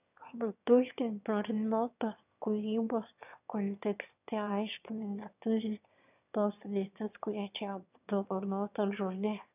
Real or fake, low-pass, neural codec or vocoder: fake; 3.6 kHz; autoencoder, 22.05 kHz, a latent of 192 numbers a frame, VITS, trained on one speaker